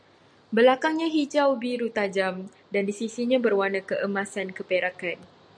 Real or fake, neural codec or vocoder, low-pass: real; none; 9.9 kHz